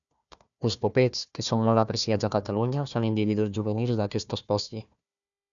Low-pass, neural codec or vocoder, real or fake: 7.2 kHz; codec, 16 kHz, 1 kbps, FunCodec, trained on Chinese and English, 50 frames a second; fake